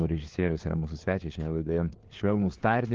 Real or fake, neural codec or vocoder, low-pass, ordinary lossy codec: fake; codec, 16 kHz, 4 kbps, FunCodec, trained on LibriTTS, 50 frames a second; 7.2 kHz; Opus, 16 kbps